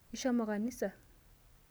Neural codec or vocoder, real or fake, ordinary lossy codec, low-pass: none; real; none; none